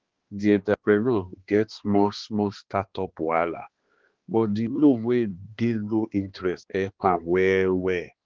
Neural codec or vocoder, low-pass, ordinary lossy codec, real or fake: codec, 16 kHz, 2 kbps, X-Codec, HuBERT features, trained on balanced general audio; 7.2 kHz; Opus, 16 kbps; fake